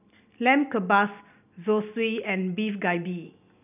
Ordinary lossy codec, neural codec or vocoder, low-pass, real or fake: none; none; 3.6 kHz; real